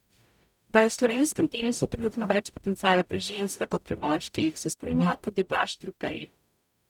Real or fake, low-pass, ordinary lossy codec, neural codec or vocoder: fake; 19.8 kHz; none; codec, 44.1 kHz, 0.9 kbps, DAC